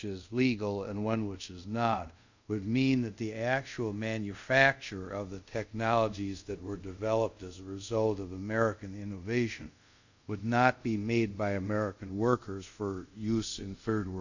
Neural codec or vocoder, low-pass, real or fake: codec, 24 kHz, 0.5 kbps, DualCodec; 7.2 kHz; fake